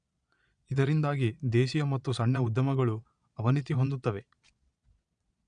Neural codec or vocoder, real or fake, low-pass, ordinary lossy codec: vocoder, 22.05 kHz, 80 mel bands, Vocos; fake; 9.9 kHz; none